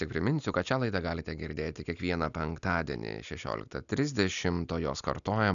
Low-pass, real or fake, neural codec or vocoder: 7.2 kHz; real; none